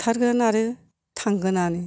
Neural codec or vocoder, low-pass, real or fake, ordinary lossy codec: none; none; real; none